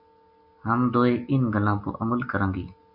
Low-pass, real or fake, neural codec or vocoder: 5.4 kHz; real; none